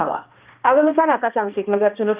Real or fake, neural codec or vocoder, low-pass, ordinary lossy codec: fake; codec, 16 kHz, 1 kbps, X-Codec, HuBERT features, trained on balanced general audio; 3.6 kHz; Opus, 24 kbps